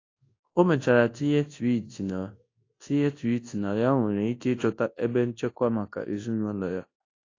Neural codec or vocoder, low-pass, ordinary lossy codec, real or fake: codec, 24 kHz, 0.9 kbps, WavTokenizer, large speech release; 7.2 kHz; AAC, 32 kbps; fake